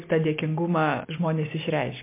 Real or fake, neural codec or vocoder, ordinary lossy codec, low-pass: real; none; MP3, 24 kbps; 3.6 kHz